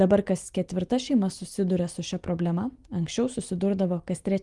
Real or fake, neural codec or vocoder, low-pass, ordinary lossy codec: real; none; 10.8 kHz; Opus, 24 kbps